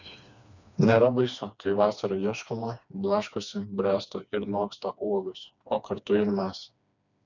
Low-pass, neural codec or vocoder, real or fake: 7.2 kHz; codec, 16 kHz, 2 kbps, FreqCodec, smaller model; fake